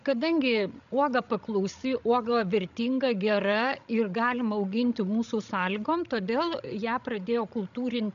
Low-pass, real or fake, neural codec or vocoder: 7.2 kHz; fake; codec, 16 kHz, 16 kbps, FreqCodec, larger model